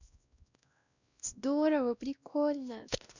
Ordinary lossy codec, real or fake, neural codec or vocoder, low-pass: none; fake; codec, 16 kHz, 1 kbps, X-Codec, WavLM features, trained on Multilingual LibriSpeech; 7.2 kHz